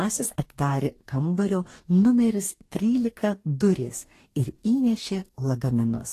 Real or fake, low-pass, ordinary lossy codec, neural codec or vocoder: fake; 14.4 kHz; AAC, 48 kbps; codec, 44.1 kHz, 2.6 kbps, DAC